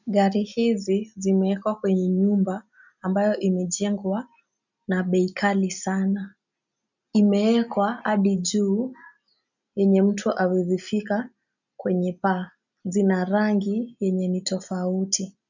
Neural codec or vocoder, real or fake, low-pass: none; real; 7.2 kHz